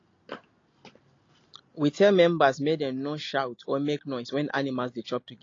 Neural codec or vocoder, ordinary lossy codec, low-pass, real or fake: none; AAC, 32 kbps; 7.2 kHz; real